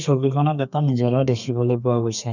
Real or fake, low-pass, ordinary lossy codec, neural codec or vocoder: fake; 7.2 kHz; none; codec, 32 kHz, 1.9 kbps, SNAC